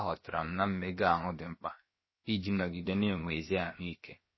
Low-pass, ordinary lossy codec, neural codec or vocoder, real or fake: 7.2 kHz; MP3, 24 kbps; codec, 16 kHz, about 1 kbps, DyCAST, with the encoder's durations; fake